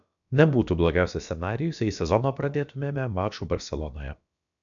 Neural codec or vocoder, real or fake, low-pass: codec, 16 kHz, about 1 kbps, DyCAST, with the encoder's durations; fake; 7.2 kHz